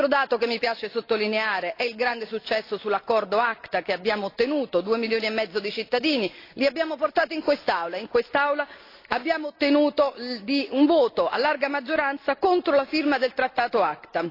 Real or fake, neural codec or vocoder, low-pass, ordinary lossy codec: real; none; 5.4 kHz; AAC, 32 kbps